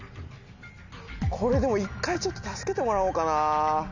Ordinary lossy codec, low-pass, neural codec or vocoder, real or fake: none; 7.2 kHz; none; real